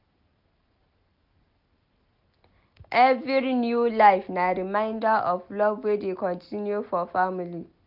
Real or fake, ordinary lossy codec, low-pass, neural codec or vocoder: real; none; 5.4 kHz; none